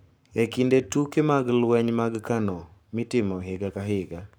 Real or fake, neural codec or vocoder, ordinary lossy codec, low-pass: fake; codec, 44.1 kHz, 7.8 kbps, Pupu-Codec; none; none